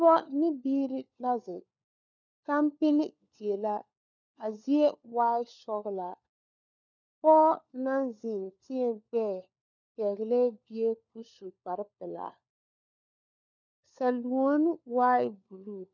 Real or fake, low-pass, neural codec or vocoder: fake; 7.2 kHz; codec, 16 kHz, 4 kbps, FunCodec, trained on LibriTTS, 50 frames a second